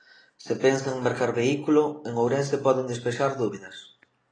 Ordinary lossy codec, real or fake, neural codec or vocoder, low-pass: AAC, 32 kbps; real; none; 9.9 kHz